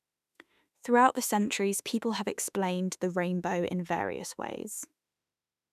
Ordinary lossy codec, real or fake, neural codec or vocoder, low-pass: none; fake; autoencoder, 48 kHz, 32 numbers a frame, DAC-VAE, trained on Japanese speech; 14.4 kHz